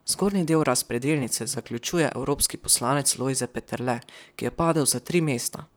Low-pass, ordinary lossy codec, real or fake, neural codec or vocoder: none; none; fake; vocoder, 44.1 kHz, 128 mel bands, Pupu-Vocoder